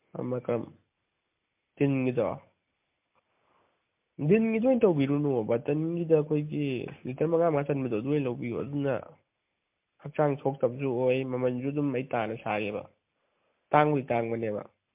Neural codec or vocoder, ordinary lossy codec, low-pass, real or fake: none; MP3, 32 kbps; 3.6 kHz; real